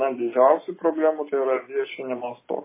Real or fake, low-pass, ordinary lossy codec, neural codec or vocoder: real; 3.6 kHz; MP3, 16 kbps; none